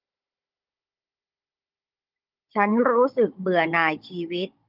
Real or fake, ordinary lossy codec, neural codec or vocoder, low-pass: fake; Opus, 32 kbps; codec, 16 kHz, 16 kbps, FunCodec, trained on Chinese and English, 50 frames a second; 5.4 kHz